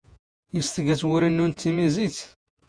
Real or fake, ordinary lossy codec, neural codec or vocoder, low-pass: fake; Opus, 64 kbps; vocoder, 48 kHz, 128 mel bands, Vocos; 9.9 kHz